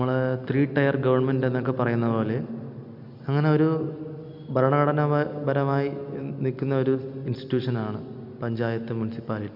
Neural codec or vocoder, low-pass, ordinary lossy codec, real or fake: none; 5.4 kHz; none; real